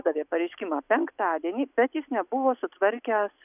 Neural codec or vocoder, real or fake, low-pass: none; real; 3.6 kHz